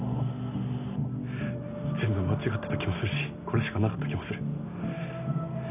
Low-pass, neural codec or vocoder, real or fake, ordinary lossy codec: 3.6 kHz; none; real; none